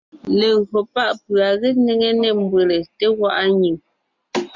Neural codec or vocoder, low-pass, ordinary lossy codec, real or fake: none; 7.2 kHz; MP3, 64 kbps; real